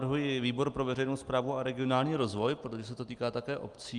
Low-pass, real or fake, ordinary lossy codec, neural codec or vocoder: 10.8 kHz; real; Opus, 32 kbps; none